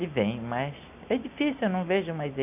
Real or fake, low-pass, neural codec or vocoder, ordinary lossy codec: real; 3.6 kHz; none; none